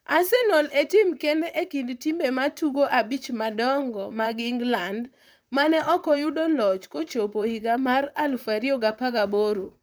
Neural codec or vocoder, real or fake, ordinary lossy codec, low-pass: vocoder, 44.1 kHz, 128 mel bands, Pupu-Vocoder; fake; none; none